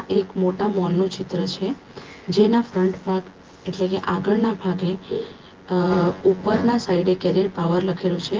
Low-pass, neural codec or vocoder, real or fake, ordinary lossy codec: 7.2 kHz; vocoder, 24 kHz, 100 mel bands, Vocos; fake; Opus, 16 kbps